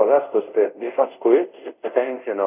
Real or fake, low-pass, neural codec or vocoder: fake; 3.6 kHz; codec, 24 kHz, 0.5 kbps, DualCodec